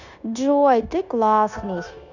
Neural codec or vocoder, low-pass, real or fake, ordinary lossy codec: codec, 16 kHz, 0.9 kbps, LongCat-Audio-Codec; 7.2 kHz; fake; none